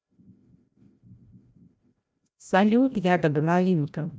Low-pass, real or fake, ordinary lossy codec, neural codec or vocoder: none; fake; none; codec, 16 kHz, 0.5 kbps, FreqCodec, larger model